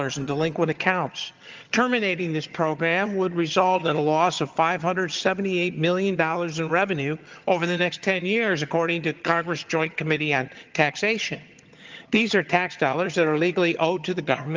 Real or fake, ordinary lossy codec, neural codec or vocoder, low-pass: fake; Opus, 24 kbps; vocoder, 22.05 kHz, 80 mel bands, HiFi-GAN; 7.2 kHz